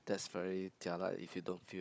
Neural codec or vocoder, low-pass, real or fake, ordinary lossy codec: codec, 16 kHz, 16 kbps, FunCodec, trained on Chinese and English, 50 frames a second; none; fake; none